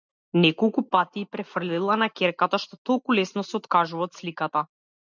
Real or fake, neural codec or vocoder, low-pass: real; none; 7.2 kHz